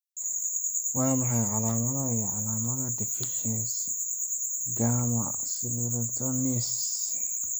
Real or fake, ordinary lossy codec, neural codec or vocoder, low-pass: real; none; none; none